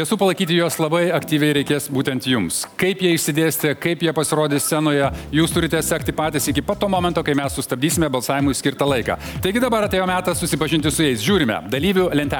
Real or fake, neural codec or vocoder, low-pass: real; none; 19.8 kHz